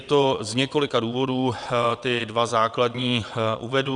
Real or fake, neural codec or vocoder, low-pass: fake; vocoder, 22.05 kHz, 80 mel bands, WaveNeXt; 9.9 kHz